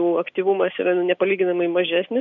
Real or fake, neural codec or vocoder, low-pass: real; none; 7.2 kHz